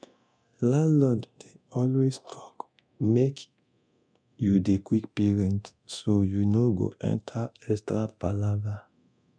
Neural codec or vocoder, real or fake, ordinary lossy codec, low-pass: codec, 24 kHz, 0.9 kbps, DualCodec; fake; none; 9.9 kHz